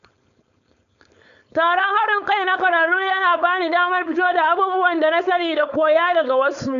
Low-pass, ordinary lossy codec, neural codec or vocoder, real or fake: 7.2 kHz; MP3, 96 kbps; codec, 16 kHz, 4.8 kbps, FACodec; fake